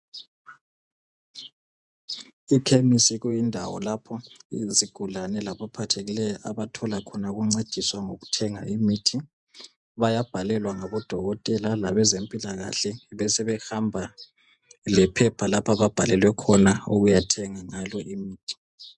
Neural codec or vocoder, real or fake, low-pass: vocoder, 48 kHz, 128 mel bands, Vocos; fake; 10.8 kHz